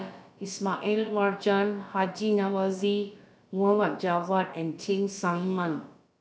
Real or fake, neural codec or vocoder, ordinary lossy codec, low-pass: fake; codec, 16 kHz, about 1 kbps, DyCAST, with the encoder's durations; none; none